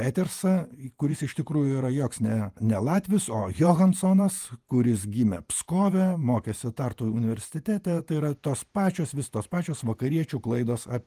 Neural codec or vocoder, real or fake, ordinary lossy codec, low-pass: vocoder, 48 kHz, 128 mel bands, Vocos; fake; Opus, 32 kbps; 14.4 kHz